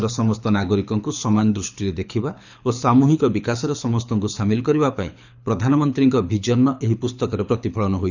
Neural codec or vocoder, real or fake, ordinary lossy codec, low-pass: codec, 24 kHz, 6 kbps, HILCodec; fake; none; 7.2 kHz